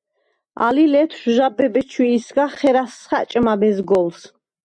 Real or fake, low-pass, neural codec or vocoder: real; 9.9 kHz; none